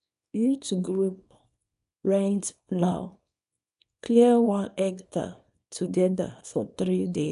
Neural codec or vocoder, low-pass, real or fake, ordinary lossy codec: codec, 24 kHz, 0.9 kbps, WavTokenizer, small release; 10.8 kHz; fake; none